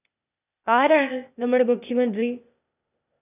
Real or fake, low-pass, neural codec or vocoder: fake; 3.6 kHz; codec, 16 kHz, 0.8 kbps, ZipCodec